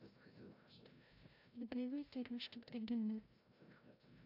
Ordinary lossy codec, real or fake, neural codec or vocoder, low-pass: AAC, 48 kbps; fake; codec, 16 kHz, 0.5 kbps, FreqCodec, larger model; 5.4 kHz